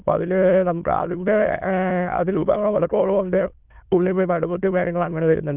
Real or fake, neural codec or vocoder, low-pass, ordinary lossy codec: fake; autoencoder, 22.05 kHz, a latent of 192 numbers a frame, VITS, trained on many speakers; 3.6 kHz; Opus, 24 kbps